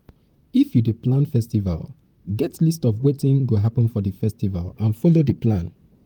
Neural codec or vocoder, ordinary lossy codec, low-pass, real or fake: vocoder, 44.1 kHz, 128 mel bands, Pupu-Vocoder; Opus, 32 kbps; 19.8 kHz; fake